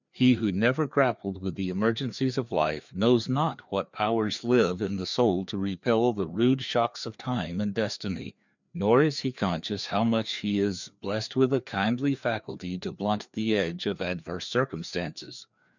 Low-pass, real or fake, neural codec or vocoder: 7.2 kHz; fake; codec, 16 kHz, 2 kbps, FreqCodec, larger model